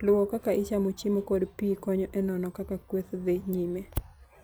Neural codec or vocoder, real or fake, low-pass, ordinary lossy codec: vocoder, 44.1 kHz, 128 mel bands every 256 samples, BigVGAN v2; fake; none; none